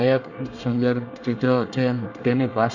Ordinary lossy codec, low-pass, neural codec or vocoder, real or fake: none; 7.2 kHz; codec, 24 kHz, 1 kbps, SNAC; fake